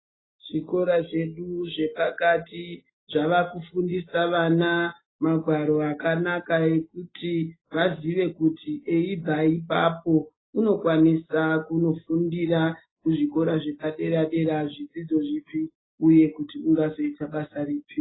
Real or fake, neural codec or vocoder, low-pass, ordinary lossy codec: real; none; 7.2 kHz; AAC, 16 kbps